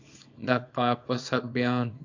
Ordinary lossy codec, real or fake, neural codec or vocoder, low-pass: AAC, 48 kbps; fake; codec, 24 kHz, 0.9 kbps, WavTokenizer, small release; 7.2 kHz